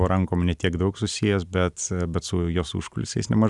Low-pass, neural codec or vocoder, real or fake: 10.8 kHz; none; real